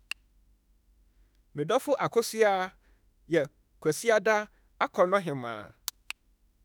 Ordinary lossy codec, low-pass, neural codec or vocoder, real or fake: none; none; autoencoder, 48 kHz, 32 numbers a frame, DAC-VAE, trained on Japanese speech; fake